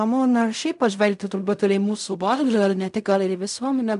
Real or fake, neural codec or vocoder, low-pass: fake; codec, 16 kHz in and 24 kHz out, 0.4 kbps, LongCat-Audio-Codec, fine tuned four codebook decoder; 10.8 kHz